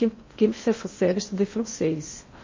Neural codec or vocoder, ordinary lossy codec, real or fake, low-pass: codec, 16 kHz in and 24 kHz out, 0.6 kbps, FocalCodec, streaming, 2048 codes; MP3, 32 kbps; fake; 7.2 kHz